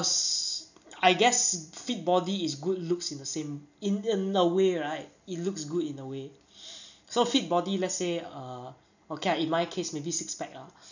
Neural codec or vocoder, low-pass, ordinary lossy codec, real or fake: none; 7.2 kHz; none; real